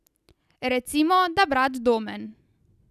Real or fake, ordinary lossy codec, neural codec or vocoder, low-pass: real; none; none; 14.4 kHz